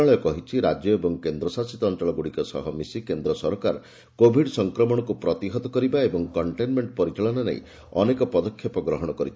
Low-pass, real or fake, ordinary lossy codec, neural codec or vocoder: none; real; none; none